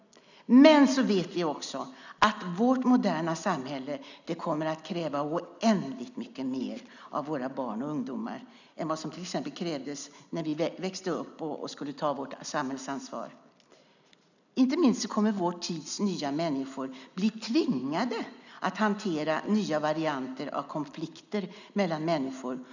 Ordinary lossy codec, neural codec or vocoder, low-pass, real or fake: none; none; 7.2 kHz; real